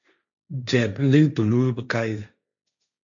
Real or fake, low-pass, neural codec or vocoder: fake; 7.2 kHz; codec, 16 kHz, 1.1 kbps, Voila-Tokenizer